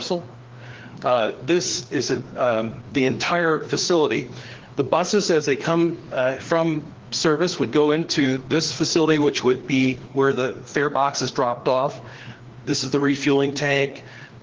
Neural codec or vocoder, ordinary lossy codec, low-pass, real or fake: codec, 16 kHz, 2 kbps, FreqCodec, larger model; Opus, 16 kbps; 7.2 kHz; fake